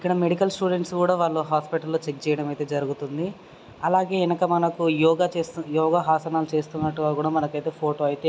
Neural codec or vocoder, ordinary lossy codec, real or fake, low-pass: none; none; real; none